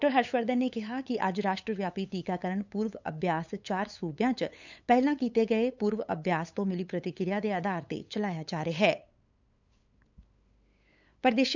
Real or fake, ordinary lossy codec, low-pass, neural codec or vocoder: fake; none; 7.2 kHz; codec, 16 kHz, 8 kbps, FunCodec, trained on LibriTTS, 25 frames a second